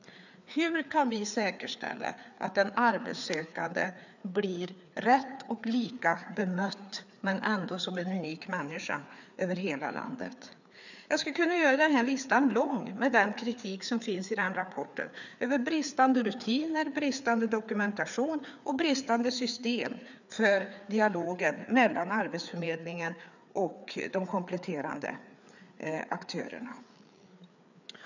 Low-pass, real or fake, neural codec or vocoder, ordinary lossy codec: 7.2 kHz; fake; codec, 16 kHz, 4 kbps, FreqCodec, larger model; none